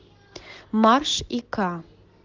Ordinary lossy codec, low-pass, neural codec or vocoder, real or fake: Opus, 16 kbps; 7.2 kHz; none; real